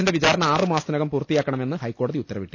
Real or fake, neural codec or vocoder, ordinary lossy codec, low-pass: real; none; none; none